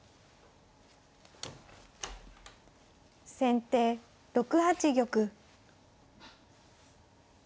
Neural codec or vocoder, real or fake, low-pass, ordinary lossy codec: none; real; none; none